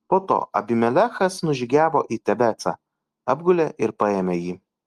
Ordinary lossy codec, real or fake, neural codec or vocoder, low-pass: Opus, 24 kbps; real; none; 14.4 kHz